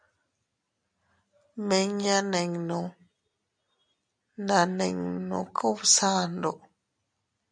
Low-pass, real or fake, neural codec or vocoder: 9.9 kHz; real; none